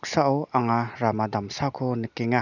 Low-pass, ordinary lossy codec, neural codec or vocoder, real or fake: 7.2 kHz; none; none; real